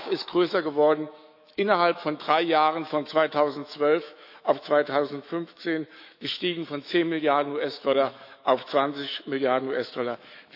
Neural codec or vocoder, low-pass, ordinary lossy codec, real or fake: autoencoder, 48 kHz, 128 numbers a frame, DAC-VAE, trained on Japanese speech; 5.4 kHz; AAC, 48 kbps; fake